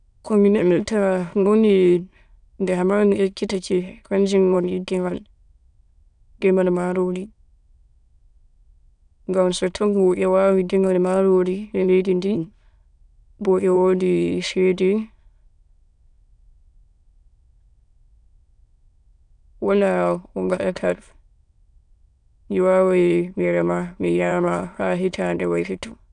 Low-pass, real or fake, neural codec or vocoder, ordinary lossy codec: 9.9 kHz; fake; autoencoder, 22.05 kHz, a latent of 192 numbers a frame, VITS, trained on many speakers; none